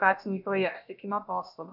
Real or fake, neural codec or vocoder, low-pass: fake; codec, 16 kHz, about 1 kbps, DyCAST, with the encoder's durations; 5.4 kHz